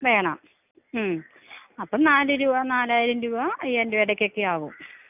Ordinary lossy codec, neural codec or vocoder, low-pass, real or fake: none; none; 3.6 kHz; real